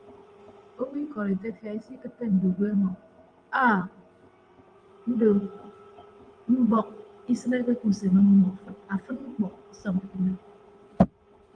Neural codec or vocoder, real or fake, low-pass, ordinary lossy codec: vocoder, 22.05 kHz, 80 mel bands, WaveNeXt; fake; 9.9 kHz; Opus, 32 kbps